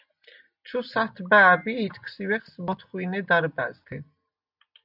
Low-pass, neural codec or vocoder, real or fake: 5.4 kHz; none; real